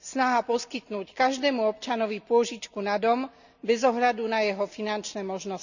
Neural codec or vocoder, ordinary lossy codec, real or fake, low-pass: none; none; real; 7.2 kHz